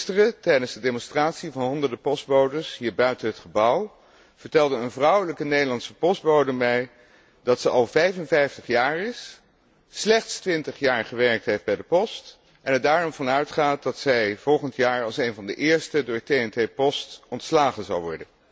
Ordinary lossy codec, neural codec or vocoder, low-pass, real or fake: none; none; none; real